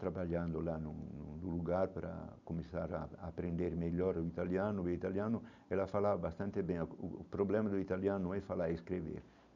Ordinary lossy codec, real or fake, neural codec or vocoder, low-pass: none; real; none; 7.2 kHz